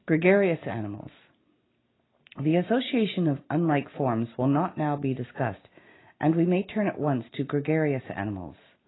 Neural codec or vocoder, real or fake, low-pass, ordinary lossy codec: none; real; 7.2 kHz; AAC, 16 kbps